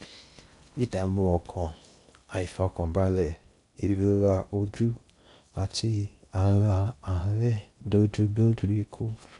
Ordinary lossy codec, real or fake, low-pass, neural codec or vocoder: none; fake; 10.8 kHz; codec, 16 kHz in and 24 kHz out, 0.6 kbps, FocalCodec, streaming, 4096 codes